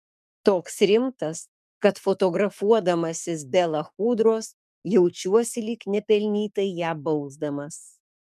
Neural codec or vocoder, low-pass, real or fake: codec, 44.1 kHz, 7.8 kbps, DAC; 14.4 kHz; fake